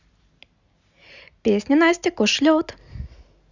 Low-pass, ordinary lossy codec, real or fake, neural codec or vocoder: 7.2 kHz; Opus, 64 kbps; real; none